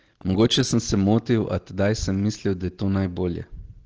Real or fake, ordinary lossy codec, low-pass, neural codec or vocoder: real; Opus, 16 kbps; 7.2 kHz; none